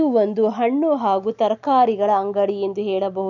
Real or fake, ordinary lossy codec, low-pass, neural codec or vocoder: real; none; 7.2 kHz; none